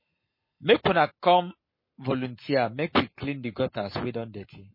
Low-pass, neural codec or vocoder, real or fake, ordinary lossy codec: 5.4 kHz; codec, 44.1 kHz, 7.8 kbps, Pupu-Codec; fake; MP3, 24 kbps